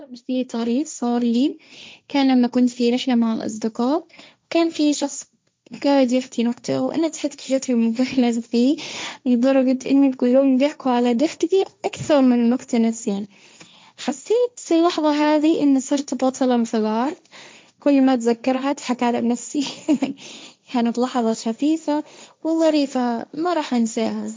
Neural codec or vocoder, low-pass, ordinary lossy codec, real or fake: codec, 16 kHz, 1.1 kbps, Voila-Tokenizer; none; none; fake